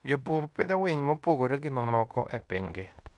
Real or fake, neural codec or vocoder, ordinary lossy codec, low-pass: fake; codec, 16 kHz in and 24 kHz out, 0.9 kbps, LongCat-Audio-Codec, fine tuned four codebook decoder; none; 10.8 kHz